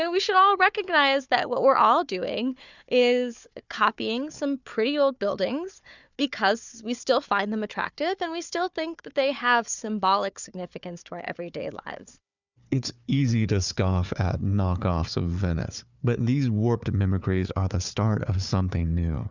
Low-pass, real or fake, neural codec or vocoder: 7.2 kHz; fake; codec, 16 kHz, 4 kbps, FunCodec, trained on Chinese and English, 50 frames a second